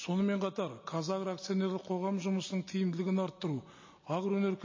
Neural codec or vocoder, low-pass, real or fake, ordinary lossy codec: none; 7.2 kHz; real; MP3, 32 kbps